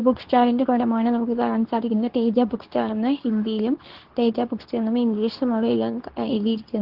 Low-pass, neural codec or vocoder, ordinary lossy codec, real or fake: 5.4 kHz; codec, 24 kHz, 0.9 kbps, WavTokenizer, medium speech release version 2; Opus, 16 kbps; fake